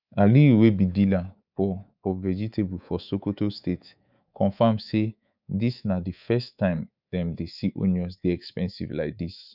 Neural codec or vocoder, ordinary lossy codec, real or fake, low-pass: codec, 24 kHz, 3.1 kbps, DualCodec; none; fake; 5.4 kHz